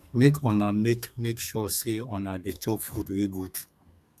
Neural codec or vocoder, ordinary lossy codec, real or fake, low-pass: codec, 32 kHz, 1.9 kbps, SNAC; none; fake; 14.4 kHz